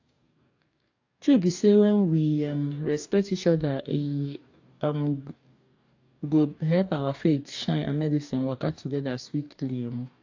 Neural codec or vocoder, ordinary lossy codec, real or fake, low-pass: codec, 44.1 kHz, 2.6 kbps, DAC; AAC, 48 kbps; fake; 7.2 kHz